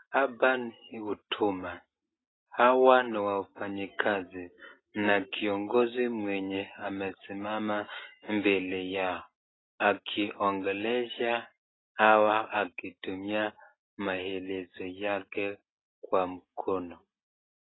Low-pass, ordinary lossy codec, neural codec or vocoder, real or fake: 7.2 kHz; AAC, 16 kbps; none; real